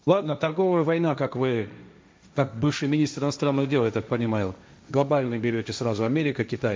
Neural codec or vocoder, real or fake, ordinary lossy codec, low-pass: codec, 16 kHz, 1.1 kbps, Voila-Tokenizer; fake; none; none